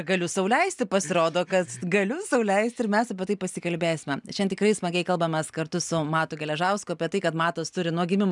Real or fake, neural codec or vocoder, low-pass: real; none; 10.8 kHz